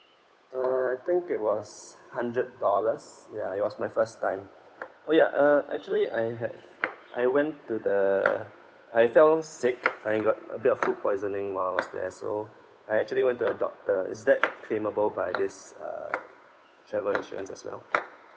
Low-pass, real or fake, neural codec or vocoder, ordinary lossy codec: none; fake; codec, 16 kHz, 8 kbps, FunCodec, trained on Chinese and English, 25 frames a second; none